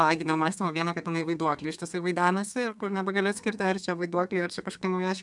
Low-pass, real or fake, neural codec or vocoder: 10.8 kHz; fake; codec, 32 kHz, 1.9 kbps, SNAC